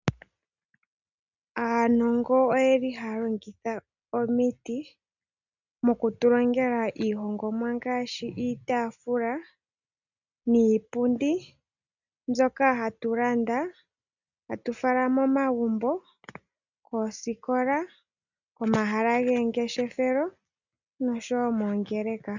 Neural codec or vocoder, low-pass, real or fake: none; 7.2 kHz; real